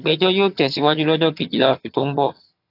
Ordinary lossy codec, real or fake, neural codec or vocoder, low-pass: AAC, 32 kbps; fake; vocoder, 22.05 kHz, 80 mel bands, HiFi-GAN; 5.4 kHz